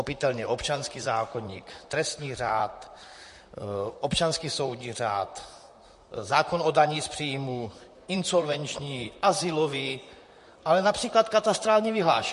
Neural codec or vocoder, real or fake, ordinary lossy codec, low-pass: vocoder, 44.1 kHz, 128 mel bands, Pupu-Vocoder; fake; MP3, 48 kbps; 14.4 kHz